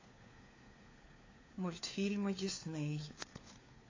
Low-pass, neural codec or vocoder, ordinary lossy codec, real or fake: 7.2 kHz; codec, 16 kHz, 4 kbps, FunCodec, trained on LibriTTS, 50 frames a second; AAC, 32 kbps; fake